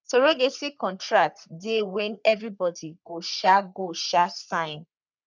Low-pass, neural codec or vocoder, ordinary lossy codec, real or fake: 7.2 kHz; codec, 44.1 kHz, 3.4 kbps, Pupu-Codec; none; fake